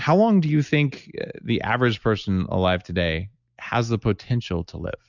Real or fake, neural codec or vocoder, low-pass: real; none; 7.2 kHz